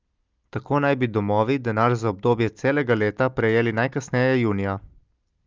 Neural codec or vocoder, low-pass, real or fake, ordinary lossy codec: none; 7.2 kHz; real; Opus, 24 kbps